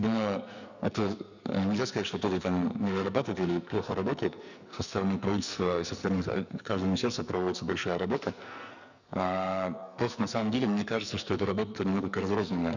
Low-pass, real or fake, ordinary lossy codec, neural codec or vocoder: 7.2 kHz; fake; none; codec, 44.1 kHz, 2.6 kbps, SNAC